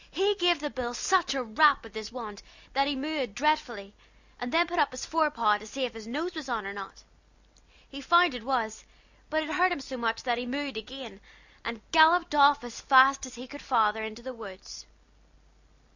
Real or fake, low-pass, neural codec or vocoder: real; 7.2 kHz; none